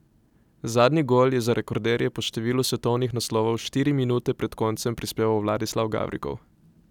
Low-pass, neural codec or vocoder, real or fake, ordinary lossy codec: 19.8 kHz; none; real; none